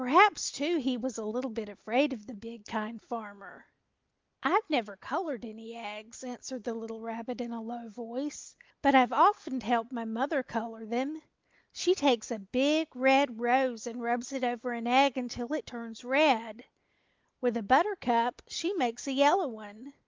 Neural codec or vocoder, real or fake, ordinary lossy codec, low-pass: none; real; Opus, 32 kbps; 7.2 kHz